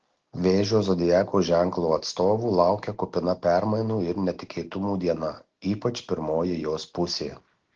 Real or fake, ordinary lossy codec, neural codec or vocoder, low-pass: real; Opus, 16 kbps; none; 7.2 kHz